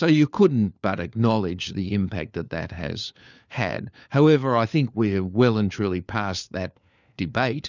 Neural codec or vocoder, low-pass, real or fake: codec, 16 kHz, 4 kbps, FunCodec, trained on LibriTTS, 50 frames a second; 7.2 kHz; fake